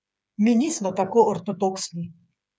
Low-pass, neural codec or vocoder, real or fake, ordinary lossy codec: none; codec, 16 kHz, 8 kbps, FreqCodec, smaller model; fake; none